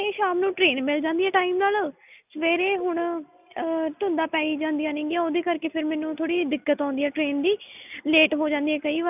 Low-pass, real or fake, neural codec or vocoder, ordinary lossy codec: 3.6 kHz; real; none; none